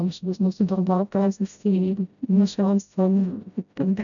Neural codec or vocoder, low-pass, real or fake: codec, 16 kHz, 0.5 kbps, FreqCodec, smaller model; 7.2 kHz; fake